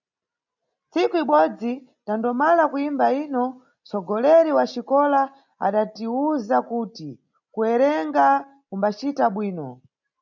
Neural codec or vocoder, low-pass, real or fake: none; 7.2 kHz; real